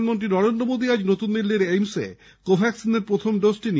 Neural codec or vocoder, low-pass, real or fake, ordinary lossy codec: none; none; real; none